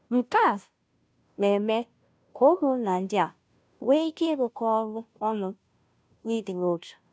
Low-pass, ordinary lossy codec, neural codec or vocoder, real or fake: none; none; codec, 16 kHz, 0.5 kbps, FunCodec, trained on Chinese and English, 25 frames a second; fake